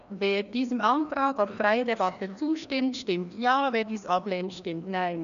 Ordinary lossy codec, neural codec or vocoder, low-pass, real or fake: none; codec, 16 kHz, 1 kbps, FreqCodec, larger model; 7.2 kHz; fake